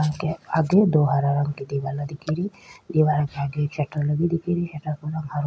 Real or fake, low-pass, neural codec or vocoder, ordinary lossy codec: real; none; none; none